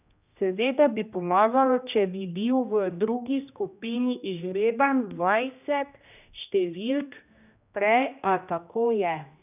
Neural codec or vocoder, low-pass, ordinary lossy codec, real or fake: codec, 16 kHz, 1 kbps, X-Codec, HuBERT features, trained on general audio; 3.6 kHz; none; fake